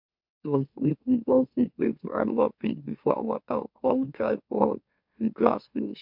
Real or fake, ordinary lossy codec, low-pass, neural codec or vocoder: fake; MP3, 48 kbps; 5.4 kHz; autoencoder, 44.1 kHz, a latent of 192 numbers a frame, MeloTTS